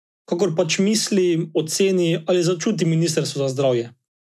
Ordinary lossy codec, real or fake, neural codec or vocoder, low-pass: none; real; none; none